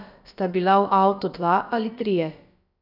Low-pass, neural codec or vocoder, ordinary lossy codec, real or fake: 5.4 kHz; codec, 16 kHz, about 1 kbps, DyCAST, with the encoder's durations; none; fake